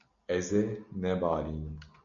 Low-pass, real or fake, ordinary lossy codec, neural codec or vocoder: 7.2 kHz; real; MP3, 96 kbps; none